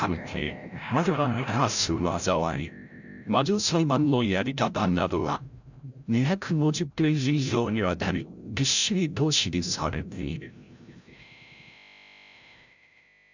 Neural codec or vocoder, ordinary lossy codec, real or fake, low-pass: codec, 16 kHz, 0.5 kbps, FreqCodec, larger model; none; fake; 7.2 kHz